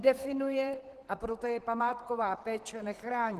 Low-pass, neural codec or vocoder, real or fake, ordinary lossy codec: 14.4 kHz; vocoder, 44.1 kHz, 128 mel bands, Pupu-Vocoder; fake; Opus, 16 kbps